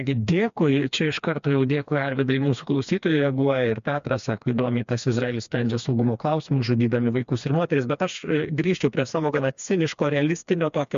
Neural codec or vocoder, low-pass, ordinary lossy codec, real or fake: codec, 16 kHz, 2 kbps, FreqCodec, smaller model; 7.2 kHz; MP3, 64 kbps; fake